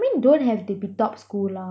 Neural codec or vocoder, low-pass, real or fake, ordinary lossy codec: none; none; real; none